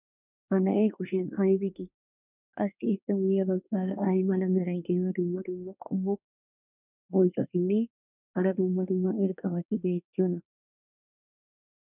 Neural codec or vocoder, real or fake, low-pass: codec, 24 kHz, 1 kbps, SNAC; fake; 3.6 kHz